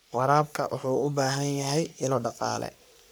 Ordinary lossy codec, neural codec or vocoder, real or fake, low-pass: none; codec, 44.1 kHz, 3.4 kbps, Pupu-Codec; fake; none